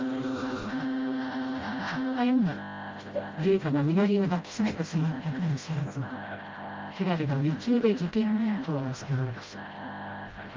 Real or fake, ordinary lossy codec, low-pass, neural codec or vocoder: fake; Opus, 32 kbps; 7.2 kHz; codec, 16 kHz, 0.5 kbps, FreqCodec, smaller model